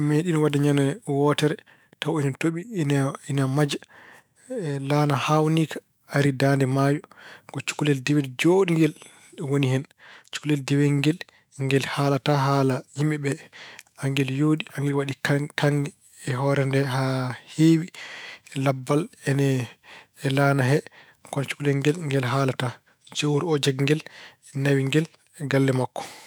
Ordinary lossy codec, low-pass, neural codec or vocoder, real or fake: none; none; autoencoder, 48 kHz, 128 numbers a frame, DAC-VAE, trained on Japanese speech; fake